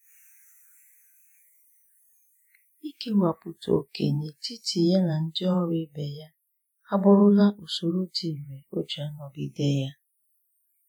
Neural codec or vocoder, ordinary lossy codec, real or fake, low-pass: vocoder, 48 kHz, 128 mel bands, Vocos; none; fake; none